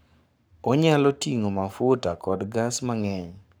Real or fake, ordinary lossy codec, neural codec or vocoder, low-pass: fake; none; codec, 44.1 kHz, 7.8 kbps, Pupu-Codec; none